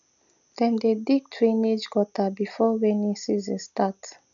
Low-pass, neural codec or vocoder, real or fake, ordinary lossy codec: 7.2 kHz; none; real; none